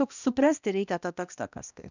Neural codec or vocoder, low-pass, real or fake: codec, 16 kHz, 1 kbps, X-Codec, HuBERT features, trained on balanced general audio; 7.2 kHz; fake